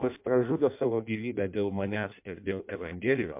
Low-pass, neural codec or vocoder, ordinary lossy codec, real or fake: 3.6 kHz; codec, 16 kHz in and 24 kHz out, 0.6 kbps, FireRedTTS-2 codec; MP3, 32 kbps; fake